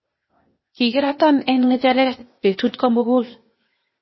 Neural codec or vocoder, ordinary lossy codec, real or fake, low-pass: codec, 16 kHz, 0.8 kbps, ZipCodec; MP3, 24 kbps; fake; 7.2 kHz